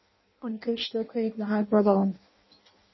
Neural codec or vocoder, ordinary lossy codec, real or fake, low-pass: codec, 16 kHz in and 24 kHz out, 0.6 kbps, FireRedTTS-2 codec; MP3, 24 kbps; fake; 7.2 kHz